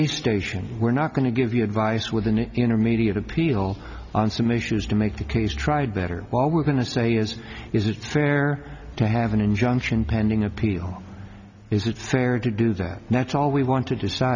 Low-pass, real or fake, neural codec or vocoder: 7.2 kHz; real; none